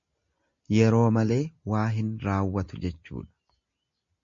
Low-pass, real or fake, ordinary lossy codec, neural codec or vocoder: 7.2 kHz; real; MP3, 48 kbps; none